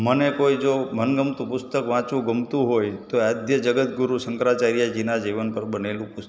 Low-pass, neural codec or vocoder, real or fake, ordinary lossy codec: none; none; real; none